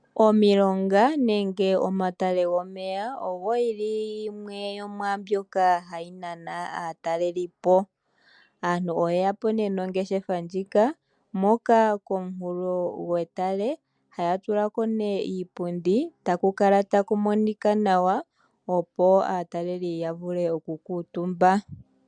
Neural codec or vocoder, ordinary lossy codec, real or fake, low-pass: none; MP3, 96 kbps; real; 9.9 kHz